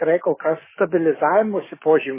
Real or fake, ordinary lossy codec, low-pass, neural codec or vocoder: fake; MP3, 16 kbps; 3.6 kHz; codec, 44.1 kHz, 7.8 kbps, DAC